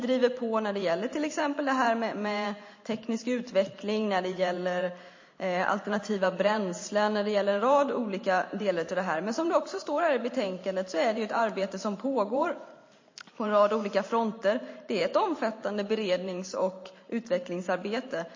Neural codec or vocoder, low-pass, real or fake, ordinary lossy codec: vocoder, 44.1 kHz, 128 mel bands every 512 samples, BigVGAN v2; 7.2 kHz; fake; MP3, 32 kbps